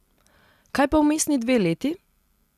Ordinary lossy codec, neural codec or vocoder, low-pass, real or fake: none; none; 14.4 kHz; real